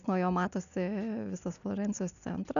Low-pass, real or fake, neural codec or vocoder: 7.2 kHz; real; none